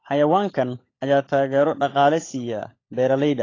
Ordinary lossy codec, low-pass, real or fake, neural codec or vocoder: AAC, 32 kbps; 7.2 kHz; fake; codec, 16 kHz, 16 kbps, FunCodec, trained on LibriTTS, 50 frames a second